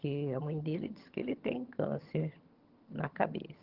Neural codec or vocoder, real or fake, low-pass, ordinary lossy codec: vocoder, 22.05 kHz, 80 mel bands, HiFi-GAN; fake; 5.4 kHz; Opus, 16 kbps